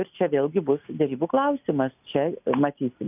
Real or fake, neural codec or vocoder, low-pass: real; none; 3.6 kHz